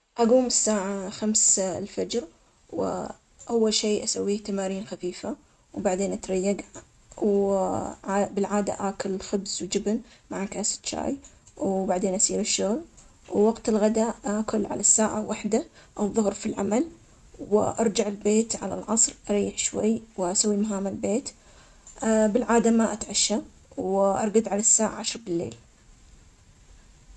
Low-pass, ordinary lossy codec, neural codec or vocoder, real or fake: none; none; none; real